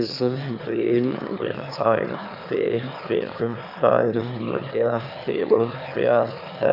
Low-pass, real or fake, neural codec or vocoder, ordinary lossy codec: 5.4 kHz; fake; autoencoder, 22.05 kHz, a latent of 192 numbers a frame, VITS, trained on one speaker; none